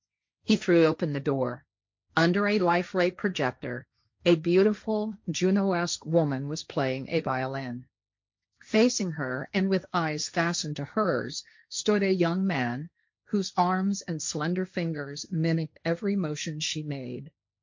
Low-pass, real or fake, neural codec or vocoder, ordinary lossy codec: 7.2 kHz; fake; codec, 16 kHz, 1.1 kbps, Voila-Tokenizer; MP3, 48 kbps